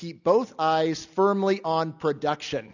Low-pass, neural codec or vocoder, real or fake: 7.2 kHz; none; real